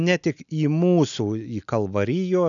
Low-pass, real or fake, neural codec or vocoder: 7.2 kHz; real; none